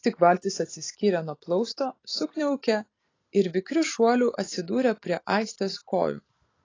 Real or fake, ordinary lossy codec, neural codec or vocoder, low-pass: real; AAC, 32 kbps; none; 7.2 kHz